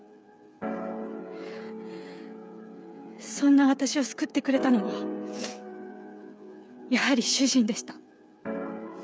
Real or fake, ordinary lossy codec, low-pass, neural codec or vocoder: fake; none; none; codec, 16 kHz, 8 kbps, FreqCodec, smaller model